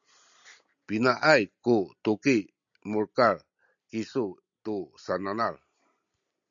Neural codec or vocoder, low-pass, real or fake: none; 7.2 kHz; real